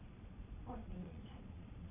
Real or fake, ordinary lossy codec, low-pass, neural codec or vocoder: fake; none; 3.6 kHz; vocoder, 22.05 kHz, 80 mel bands, Vocos